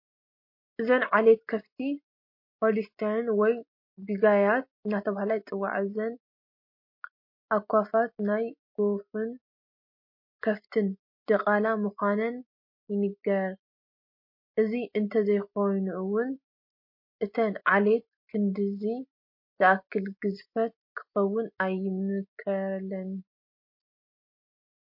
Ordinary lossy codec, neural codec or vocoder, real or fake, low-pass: MP3, 32 kbps; none; real; 5.4 kHz